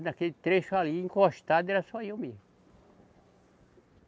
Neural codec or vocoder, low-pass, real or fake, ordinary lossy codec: none; none; real; none